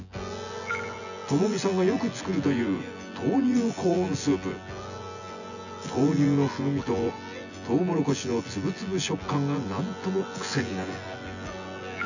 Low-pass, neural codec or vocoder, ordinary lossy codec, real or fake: 7.2 kHz; vocoder, 24 kHz, 100 mel bands, Vocos; none; fake